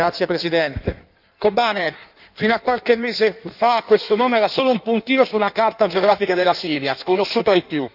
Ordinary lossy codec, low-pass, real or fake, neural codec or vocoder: none; 5.4 kHz; fake; codec, 16 kHz in and 24 kHz out, 1.1 kbps, FireRedTTS-2 codec